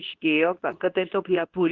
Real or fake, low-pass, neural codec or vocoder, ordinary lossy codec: fake; 7.2 kHz; codec, 24 kHz, 0.9 kbps, WavTokenizer, medium speech release version 2; Opus, 16 kbps